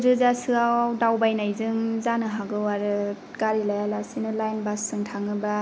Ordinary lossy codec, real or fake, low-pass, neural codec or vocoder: none; real; none; none